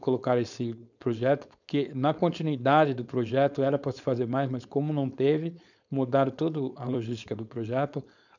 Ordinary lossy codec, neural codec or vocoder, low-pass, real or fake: none; codec, 16 kHz, 4.8 kbps, FACodec; 7.2 kHz; fake